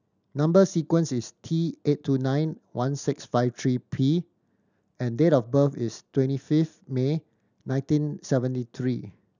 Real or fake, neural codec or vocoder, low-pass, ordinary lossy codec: real; none; 7.2 kHz; none